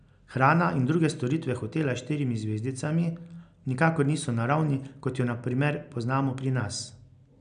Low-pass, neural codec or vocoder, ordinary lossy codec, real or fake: 9.9 kHz; none; MP3, 96 kbps; real